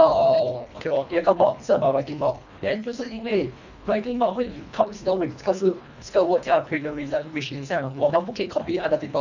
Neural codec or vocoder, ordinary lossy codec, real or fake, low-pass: codec, 24 kHz, 1.5 kbps, HILCodec; none; fake; 7.2 kHz